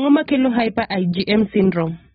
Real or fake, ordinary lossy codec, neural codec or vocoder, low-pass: real; AAC, 16 kbps; none; 7.2 kHz